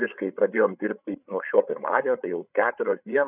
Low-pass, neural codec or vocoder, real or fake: 3.6 kHz; codec, 16 kHz, 8 kbps, FreqCodec, larger model; fake